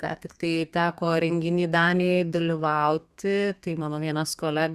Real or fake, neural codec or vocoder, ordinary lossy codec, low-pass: fake; codec, 32 kHz, 1.9 kbps, SNAC; Opus, 64 kbps; 14.4 kHz